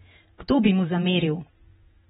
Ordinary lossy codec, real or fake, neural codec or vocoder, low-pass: AAC, 16 kbps; fake; vocoder, 48 kHz, 128 mel bands, Vocos; 19.8 kHz